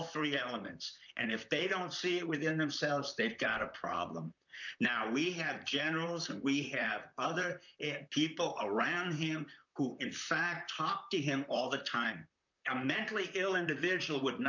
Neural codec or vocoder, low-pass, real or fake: vocoder, 44.1 kHz, 128 mel bands, Pupu-Vocoder; 7.2 kHz; fake